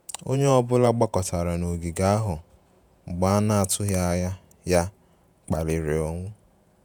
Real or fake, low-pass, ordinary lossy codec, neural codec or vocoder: fake; none; none; vocoder, 48 kHz, 128 mel bands, Vocos